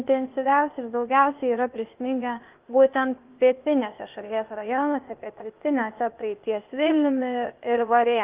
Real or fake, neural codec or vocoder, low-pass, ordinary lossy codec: fake; codec, 16 kHz, 0.8 kbps, ZipCodec; 3.6 kHz; Opus, 24 kbps